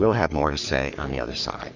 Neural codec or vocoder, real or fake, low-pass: codec, 44.1 kHz, 3.4 kbps, Pupu-Codec; fake; 7.2 kHz